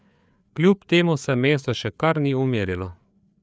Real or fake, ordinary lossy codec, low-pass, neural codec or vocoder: fake; none; none; codec, 16 kHz, 4 kbps, FreqCodec, larger model